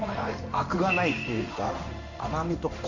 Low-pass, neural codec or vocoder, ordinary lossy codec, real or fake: 7.2 kHz; codec, 16 kHz in and 24 kHz out, 1 kbps, XY-Tokenizer; none; fake